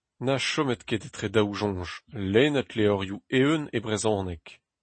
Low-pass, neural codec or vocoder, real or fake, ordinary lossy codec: 9.9 kHz; none; real; MP3, 32 kbps